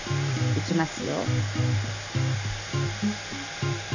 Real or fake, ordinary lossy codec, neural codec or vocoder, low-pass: real; none; none; 7.2 kHz